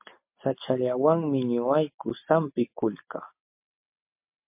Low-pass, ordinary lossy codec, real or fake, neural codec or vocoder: 3.6 kHz; MP3, 32 kbps; real; none